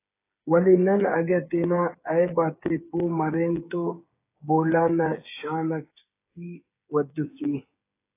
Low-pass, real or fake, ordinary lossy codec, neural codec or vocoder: 3.6 kHz; fake; AAC, 24 kbps; codec, 16 kHz, 8 kbps, FreqCodec, smaller model